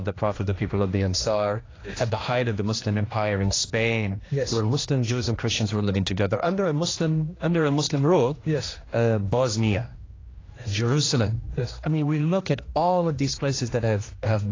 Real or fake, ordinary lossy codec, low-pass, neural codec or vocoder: fake; AAC, 32 kbps; 7.2 kHz; codec, 16 kHz, 1 kbps, X-Codec, HuBERT features, trained on general audio